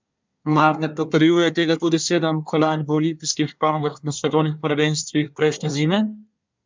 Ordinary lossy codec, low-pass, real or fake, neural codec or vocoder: MP3, 64 kbps; 7.2 kHz; fake; codec, 24 kHz, 1 kbps, SNAC